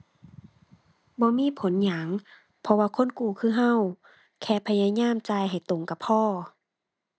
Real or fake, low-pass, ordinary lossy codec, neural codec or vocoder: real; none; none; none